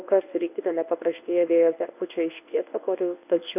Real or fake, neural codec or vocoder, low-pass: fake; codec, 24 kHz, 0.9 kbps, WavTokenizer, medium speech release version 1; 3.6 kHz